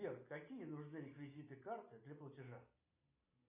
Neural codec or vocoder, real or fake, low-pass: none; real; 3.6 kHz